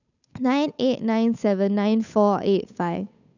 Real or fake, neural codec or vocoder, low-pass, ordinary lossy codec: fake; codec, 16 kHz, 8 kbps, FunCodec, trained on Chinese and English, 25 frames a second; 7.2 kHz; none